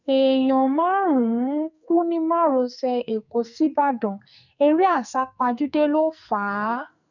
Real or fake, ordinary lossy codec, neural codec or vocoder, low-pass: fake; none; codec, 44.1 kHz, 2.6 kbps, SNAC; 7.2 kHz